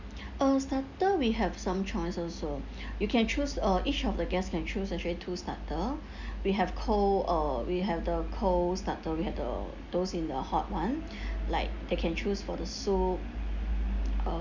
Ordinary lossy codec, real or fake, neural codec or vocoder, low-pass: none; real; none; 7.2 kHz